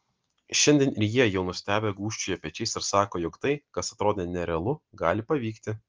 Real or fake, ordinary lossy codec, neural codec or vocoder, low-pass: real; Opus, 24 kbps; none; 7.2 kHz